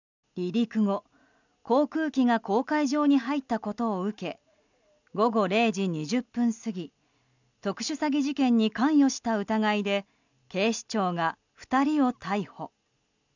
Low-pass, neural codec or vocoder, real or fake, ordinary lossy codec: 7.2 kHz; none; real; none